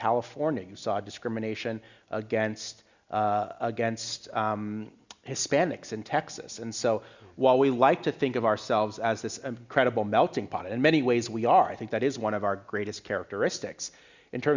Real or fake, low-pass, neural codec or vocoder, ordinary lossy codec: real; 7.2 kHz; none; Opus, 64 kbps